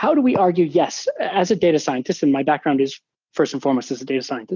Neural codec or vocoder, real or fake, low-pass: none; real; 7.2 kHz